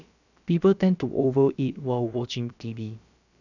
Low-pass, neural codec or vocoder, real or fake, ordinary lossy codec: 7.2 kHz; codec, 16 kHz, about 1 kbps, DyCAST, with the encoder's durations; fake; none